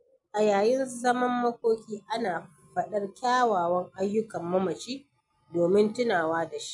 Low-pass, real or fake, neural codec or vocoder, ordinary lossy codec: 10.8 kHz; real; none; none